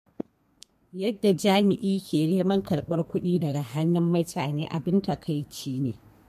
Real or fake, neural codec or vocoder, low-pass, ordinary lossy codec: fake; codec, 32 kHz, 1.9 kbps, SNAC; 14.4 kHz; MP3, 64 kbps